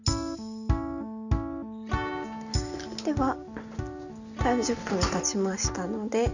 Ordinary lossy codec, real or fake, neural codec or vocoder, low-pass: none; real; none; 7.2 kHz